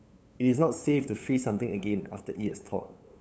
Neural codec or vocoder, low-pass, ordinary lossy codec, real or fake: codec, 16 kHz, 8 kbps, FunCodec, trained on LibriTTS, 25 frames a second; none; none; fake